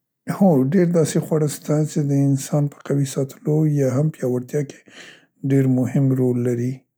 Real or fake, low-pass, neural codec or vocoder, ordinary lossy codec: real; none; none; none